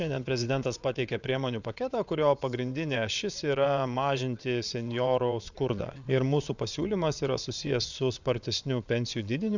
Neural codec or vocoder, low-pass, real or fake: vocoder, 22.05 kHz, 80 mel bands, WaveNeXt; 7.2 kHz; fake